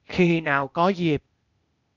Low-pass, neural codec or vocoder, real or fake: 7.2 kHz; codec, 16 kHz, 0.8 kbps, ZipCodec; fake